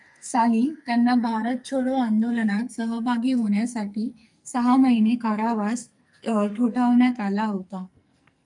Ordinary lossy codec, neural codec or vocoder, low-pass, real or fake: MP3, 96 kbps; codec, 44.1 kHz, 2.6 kbps, SNAC; 10.8 kHz; fake